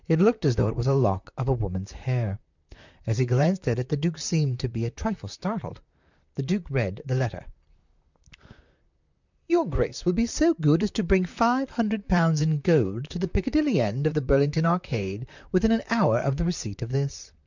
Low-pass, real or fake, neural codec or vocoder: 7.2 kHz; fake; vocoder, 44.1 kHz, 128 mel bands, Pupu-Vocoder